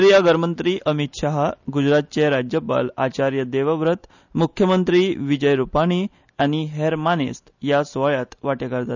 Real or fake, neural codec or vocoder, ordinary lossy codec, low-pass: real; none; none; 7.2 kHz